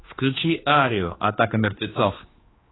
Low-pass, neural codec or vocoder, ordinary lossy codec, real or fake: 7.2 kHz; codec, 16 kHz, 2 kbps, X-Codec, HuBERT features, trained on general audio; AAC, 16 kbps; fake